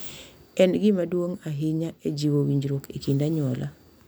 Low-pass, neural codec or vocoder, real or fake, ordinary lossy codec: none; none; real; none